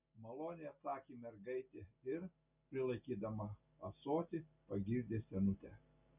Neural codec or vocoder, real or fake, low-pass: none; real; 3.6 kHz